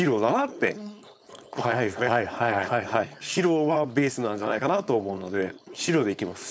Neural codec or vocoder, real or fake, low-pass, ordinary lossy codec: codec, 16 kHz, 4.8 kbps, FACodec; fake; none; none